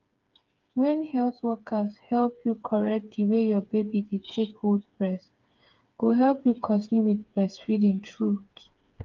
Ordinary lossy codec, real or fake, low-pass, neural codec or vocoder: Opus, 32 kbps; fake; 7.2 kHz; codec, 16 kHz, 4 kbps, FreqCodec, smaller model